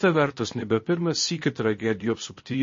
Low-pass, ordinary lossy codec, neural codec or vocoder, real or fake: 7.2 kHz; MP3, 32 kbps; codec, 16 kHz, 0.8 kbps, ZipCodec; fake